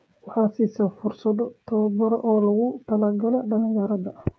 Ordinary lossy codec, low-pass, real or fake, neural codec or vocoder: none; none; fake; codec, 16 kHz, 8 kbps, FreqCodec, smaller model